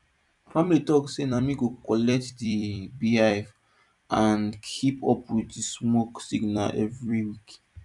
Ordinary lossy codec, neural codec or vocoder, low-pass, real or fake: none; vocoder, 44.1 kHz, 128 mel bands every 256 samples, BigVGAN v2; 10.8 kHz; fake